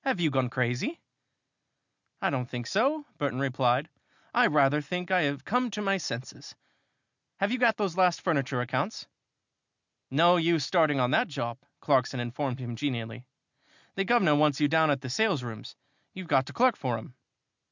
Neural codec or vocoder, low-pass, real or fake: none; 7.2 kHz; real